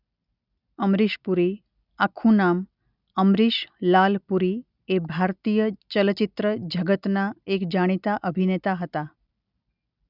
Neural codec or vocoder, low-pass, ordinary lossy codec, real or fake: none; 5.4 kHz; none; real